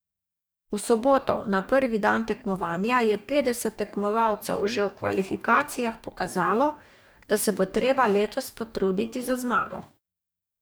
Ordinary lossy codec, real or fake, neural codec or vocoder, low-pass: none; fake; codec, 44.1 kHz, 2.6 kbps, DAC; none